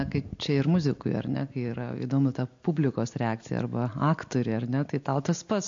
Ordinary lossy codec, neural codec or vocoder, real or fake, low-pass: MP3, 48 kbps; none; real; 7.2 kHz